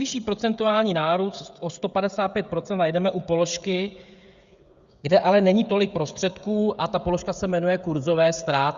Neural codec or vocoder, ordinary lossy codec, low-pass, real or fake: codec, 16 kHz, 16 kbps, FreqCodec, smaller model; Opus, 64 kbps; 7.2 kHz; fake